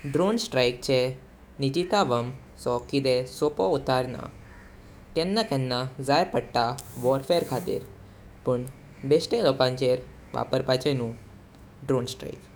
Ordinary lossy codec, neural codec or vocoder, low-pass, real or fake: none; autoencoder, 48 kHz, 128 numbers a frame, DAC-VAE, trained on Japanese speech; none; fake